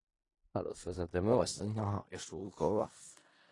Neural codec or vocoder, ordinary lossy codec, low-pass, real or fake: codec, 16 kHz in and 24 kHz out, 0.4 kbps, LongCat-Audio-Codec, four codebook decoder; AAC, 32 kbps; 10.8 kHz; fake